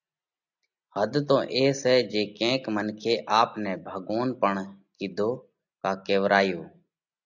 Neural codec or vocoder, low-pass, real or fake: none; 7.2 kHz; real